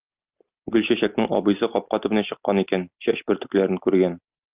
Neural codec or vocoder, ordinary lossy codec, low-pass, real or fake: none; Opus, 24 kbps; 3.6 kHz; real